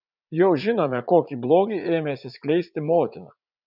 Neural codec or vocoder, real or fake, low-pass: vocoder, 44.1 kHz, 80 mel bands, Vocos; fake; 5.4 kHz